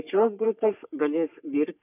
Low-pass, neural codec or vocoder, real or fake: 3.6 kHz; codec, 44.1 kHz, 3.4 kbps, Pupu-Codec; fake